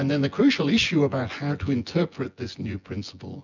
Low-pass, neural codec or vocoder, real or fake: 7.2 kHz; vocoder, 24 kHz, 100 mel bands, Vocos; fake